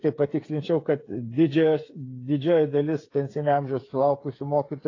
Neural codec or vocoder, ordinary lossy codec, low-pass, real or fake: codec, 16 kHz, 8 kbps, FreqCodec, smaller model; AAC, 32 kbps; 7.2 kHz; fake